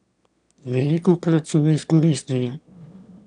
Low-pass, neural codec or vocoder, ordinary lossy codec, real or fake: 9.9 kHz; autoencoder, 22.05 kHz, a latent of 192 numbers a frame, VITS, trained on one speaker; none; fake